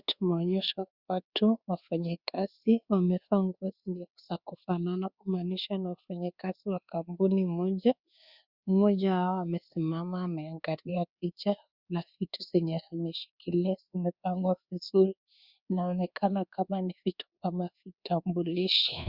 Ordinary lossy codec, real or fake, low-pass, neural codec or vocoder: Opus, 64 kbps; fake; 5.4 kHz; codec, 24 kHz, 1.2 kbps, DualCodec